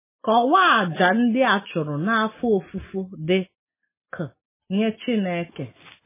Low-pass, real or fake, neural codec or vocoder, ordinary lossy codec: 3.6 kHz; real; none; MP3, 16 kbps